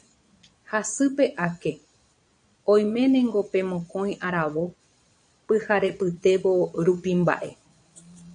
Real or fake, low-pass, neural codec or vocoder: fake; 9.9 kHz; vocoder, 22.05 kHz, 80 mel bands, Vocos